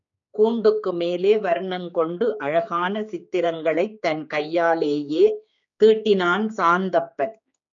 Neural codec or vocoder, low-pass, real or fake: codec, 16 kHz, 4 kbps, X-Codec, HuBERT features, trained on general audio; 7.2 kHz; fake